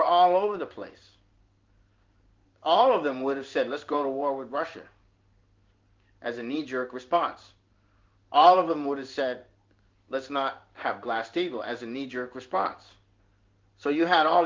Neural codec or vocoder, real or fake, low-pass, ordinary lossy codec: codec, 16 kHz in and 24 kHz out, 1 kbps, XY-Tokenizer; fake; 7.2 kHz; Opus, 32 kbps